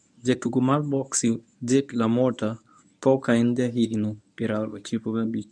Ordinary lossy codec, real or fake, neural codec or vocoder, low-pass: none; fake; codec, 24 kHz, 0.9 kbps, WavTokenizer, medium speech release version 1; 9.9 kHz